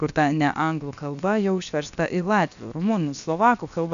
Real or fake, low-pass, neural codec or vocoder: fake; 7.2 kHz; codec, 16 kHz, about 1 kbps, DyCAST, with the encoder's durations